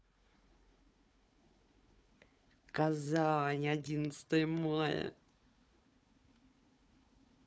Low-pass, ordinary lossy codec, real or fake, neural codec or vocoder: none; none; fake; codec, 16 kHz, 4 kbps, FunCodec, trained on Chinese and English, 50 frames a second